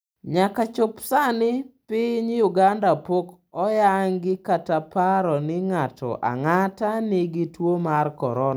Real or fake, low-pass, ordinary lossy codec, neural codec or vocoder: real; none; none; none